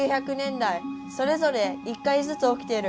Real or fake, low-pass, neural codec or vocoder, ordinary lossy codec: real; none; none; none